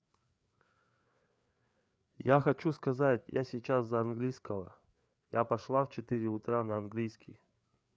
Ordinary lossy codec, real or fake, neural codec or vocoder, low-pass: none; fake; codec, 16 kHz, 4 kbps, FreqCodec, larger model; none